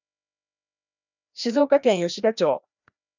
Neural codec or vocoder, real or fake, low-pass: codec, 16 kHz, 1 kbps, FreqCodec, larger model; fake; 7.2 kHz